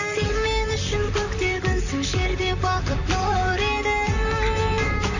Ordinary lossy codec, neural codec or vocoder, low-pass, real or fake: none; vocoder, 44.1 kHz, 128 mel bands, Pupu-Vocoder; 7.2 kHz; fake